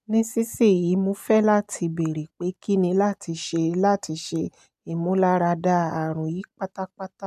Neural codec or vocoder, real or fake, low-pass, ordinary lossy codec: none; real; 14.4 kHz; MP3, 96 kbps